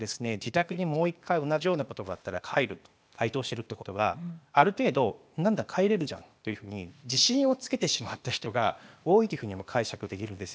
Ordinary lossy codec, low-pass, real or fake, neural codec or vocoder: none; none; fake; codec, 16 kHz, 0.8 kbps, ZipCodec